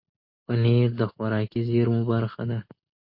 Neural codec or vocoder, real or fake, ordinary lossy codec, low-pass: none; real; MP3, 32 kbps; 5.4 kHz